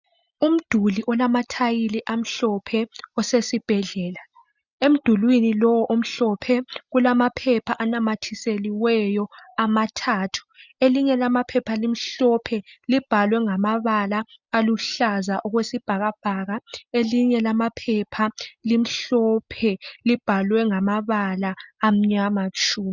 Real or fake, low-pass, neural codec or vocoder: real; 7.2 kHz; none